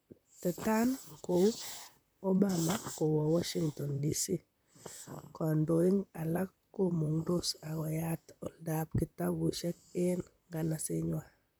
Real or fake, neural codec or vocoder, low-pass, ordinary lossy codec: fake; vocoder, 44.1 kHz, 128 mel bands, Pupu-Vocoder; none; none